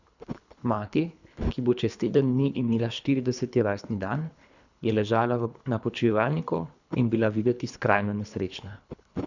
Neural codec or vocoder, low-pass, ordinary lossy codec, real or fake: codec, 24 kHz, 3 kbps, HILCodec; 7.2 kHz; none; fake